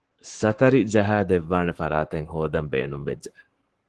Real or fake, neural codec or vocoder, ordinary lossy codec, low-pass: fake; codec, 44.1 kHz, 7.8 kbps, Pupu-Codec; Opus, 16 kbps; 10.8 kHz